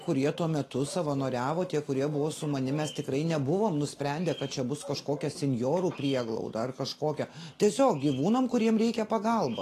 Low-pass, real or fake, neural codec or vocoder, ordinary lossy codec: 14.4 kHz; real; none; AAC, 48 kbps